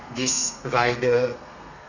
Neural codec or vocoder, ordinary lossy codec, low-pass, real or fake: codec, 32 kHz, 1.9 kbps, SNAC; none; 7.2 kHz; fake